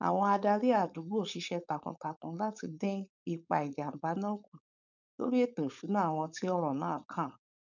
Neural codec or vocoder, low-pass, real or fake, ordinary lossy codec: codec, 16 kHz, 4.8 kbps, FACodec; 7.2 kHz; fake; none